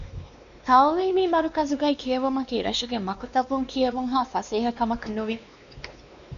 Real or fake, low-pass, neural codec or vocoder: fake; 7.2 kHz; codec, 16 kHz, 2 kbps, X-Codec, WavLM features, trained on Multilingual LibriSpeech